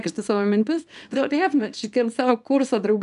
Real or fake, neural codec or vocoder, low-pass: fake; codec, 24 kHz, 0.9 kbps, WavTokenizer, small release; 10.8 kHz